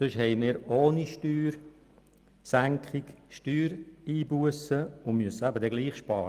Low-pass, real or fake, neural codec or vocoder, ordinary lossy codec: 14.4 kHz; real; none; Opus, 32 kbps